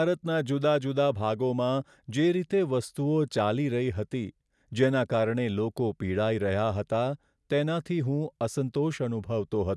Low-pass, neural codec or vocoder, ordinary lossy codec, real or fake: none; none; none; real